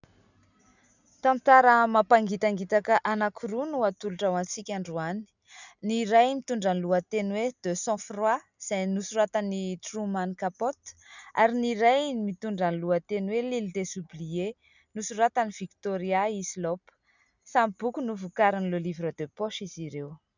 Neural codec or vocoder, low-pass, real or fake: none; 7.2 kHz; real